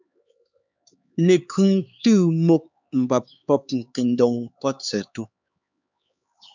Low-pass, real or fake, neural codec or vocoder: 7.2 kHz; fake; codec, 16 kHz, 4 kbps, X-Codec, HuBERT features, trained on LibriSpeech